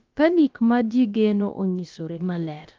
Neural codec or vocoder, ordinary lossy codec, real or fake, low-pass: codec, 16 kHz, about 1 kbps, DyCAST, with the encoder's durations; Opus, 32 kbps; fake; 7.2 kHz